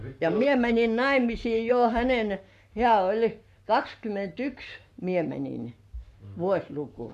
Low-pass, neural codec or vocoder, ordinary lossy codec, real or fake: 14.4 kHz; codec, 44.1 kHz, 7.8 kbps, Pupu-Codec; AAC, 96 kbps; fake